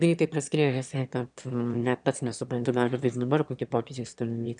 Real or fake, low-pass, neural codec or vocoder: fake; 9.9 kHz; autoencoder, 22.05 kHz, a latent of 192 numbers a frame, VITS, trained on one speaker